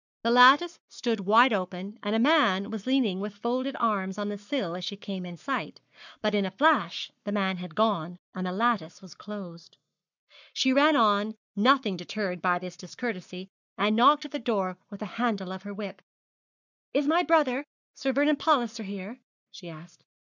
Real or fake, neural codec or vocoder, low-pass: fake; codec, 44.1 kHz, 7.8 kbps, Pupu-Codec; 7.2 kHz